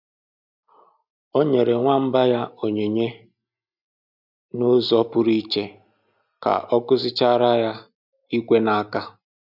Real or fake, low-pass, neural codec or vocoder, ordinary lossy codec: real; 5.4 kHz; none; none